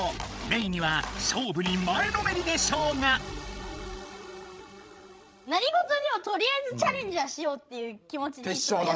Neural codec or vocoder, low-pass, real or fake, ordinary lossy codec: codec, 16 kHz, 16 kbps, FreqCodec, larger model; none; fake; none